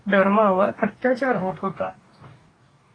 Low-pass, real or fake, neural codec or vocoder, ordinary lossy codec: 9.9 kHz; fake; codec, 44.1 kHz, 2.6 kbps, DAC; AAC, 32 kbps